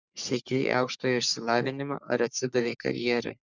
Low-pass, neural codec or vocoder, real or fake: 7.2 kHz; codec, 44.1 kHz, 3.4 kbps, Pupu-Codec; fake